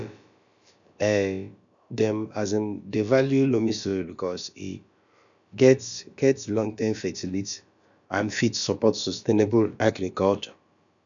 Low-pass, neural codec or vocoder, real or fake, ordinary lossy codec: 7.2 kHz; codec, 16 kHz, about 1 kbps, DyCAST, with the encoder's durations; fake; MP3, 96 kbps